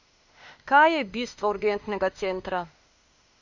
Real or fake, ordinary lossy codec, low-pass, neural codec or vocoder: fake; Opus, 64 kbps; 7.2 kHz; autoencoder, 48 kHz, 32 numbers a frame, DAC-VAE, trained on Japanese speech